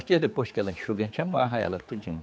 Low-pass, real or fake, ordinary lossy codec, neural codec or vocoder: none; fake; none; codec, 16 kHz, 4 kbps, X-Codec, HuBERT features, trained on general audio